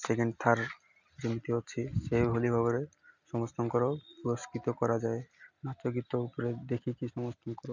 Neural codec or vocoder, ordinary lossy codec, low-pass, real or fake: none; none; 7.2 kHz; real